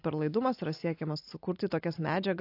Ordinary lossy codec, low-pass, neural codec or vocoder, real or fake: AAC, 32 kbps; 5.4 kHz; none; real